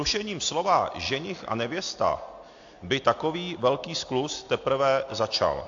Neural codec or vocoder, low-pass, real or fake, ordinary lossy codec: none; 7.2 kHz; real; AAC, 48 kbps